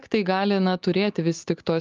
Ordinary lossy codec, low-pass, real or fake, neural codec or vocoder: Opus, 32 kbps; 7.2 kHz; real; none